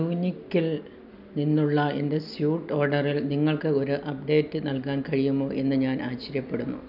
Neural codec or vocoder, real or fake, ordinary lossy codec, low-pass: none; real; none; 5.4 kHz